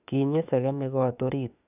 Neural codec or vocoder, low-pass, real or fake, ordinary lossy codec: autoencoder, 48 kHz, 32 numbers a frame, DAC-VAE, trained on Japanese speech; 3.6 kHz; fake; none